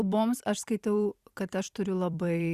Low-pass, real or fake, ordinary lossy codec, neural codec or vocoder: 14.4 kHz; fake; Opus, 64 kbps; vocoder, 44.1 kHz, 128 mel bands every 256 samples, BigVGAN v2